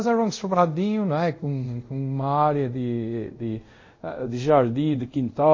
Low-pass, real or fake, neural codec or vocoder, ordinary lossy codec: 7.2 kHz; fake; codec, 24 kHz, 0.5 kbps, DualCodec; MP3, 32 kbps